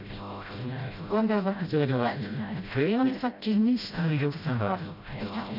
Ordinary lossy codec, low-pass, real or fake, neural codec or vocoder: none; 5.4 kHz; fake; codec, 16 kHz, 0.5 kbps, FreqCodec, smaller model